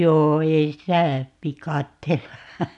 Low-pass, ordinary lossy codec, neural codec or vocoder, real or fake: 10.8 kHz; none; none; real